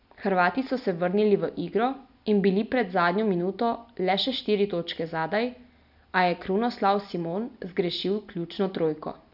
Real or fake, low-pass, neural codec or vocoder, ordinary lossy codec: real; 5.4 kHz; none; none